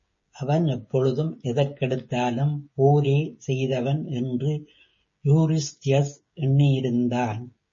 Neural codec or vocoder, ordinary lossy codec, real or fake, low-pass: codec, 16 kHz, 16 kbps, FreqCodec, smaller model; MP3, 32 kbps; fake; 7.2 kHz